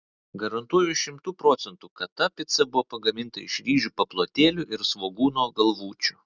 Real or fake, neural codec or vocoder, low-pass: real; none; 7.2 kHz